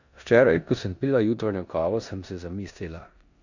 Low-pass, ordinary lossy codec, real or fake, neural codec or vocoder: 7.2 kHz; none; fake; codec, 16 kHz in and 24 kHz out, 0.9 kbps, LongCat-Audio-Codec, four codebook decoder